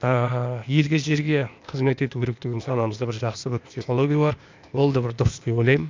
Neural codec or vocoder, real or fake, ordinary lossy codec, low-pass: codec, 16 kHz, 0.8 kbps, ZipCodec; fake; MP3, 64 kbps; 7.2 kHz